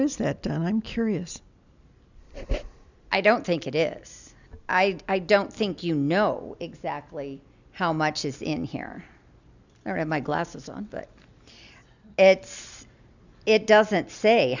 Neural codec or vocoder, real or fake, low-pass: none; real; 7.2 kHz